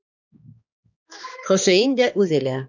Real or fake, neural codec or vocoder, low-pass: fake; codec, 16 kHz, 2 kbps, X-Codec, HuBERT features, trained on balanced general audio; 7.2 kHz